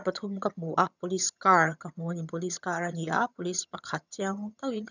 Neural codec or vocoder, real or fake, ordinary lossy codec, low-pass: vocoder, 22.05 kHz, 80 mel bands, HiFi-GAN; fake; none; 7.2 kHz